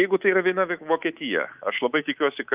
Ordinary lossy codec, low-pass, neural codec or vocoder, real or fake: Opus, 32 kbps; 3.6 kHz; codec, 24 kHz, 3.1 kbps, DualCodec; fake